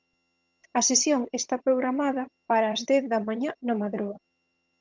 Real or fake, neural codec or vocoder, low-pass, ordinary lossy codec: fake; vocoder, 22.05 kHz, 80 mel bands, HiFi-GAN; 7.2 kHz; Opus, 32 kbps